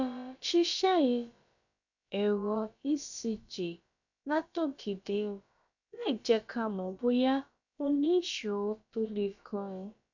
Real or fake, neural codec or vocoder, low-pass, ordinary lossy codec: fake; codec, 16 kHz, about 1 kbps, DyCAST, with the encoder's durations; 7.2 kHz; none